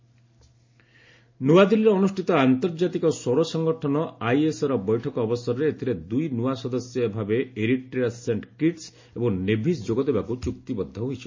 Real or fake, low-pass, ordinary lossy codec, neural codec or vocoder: real; 7.2 kHz; MP3, 32 kbps; none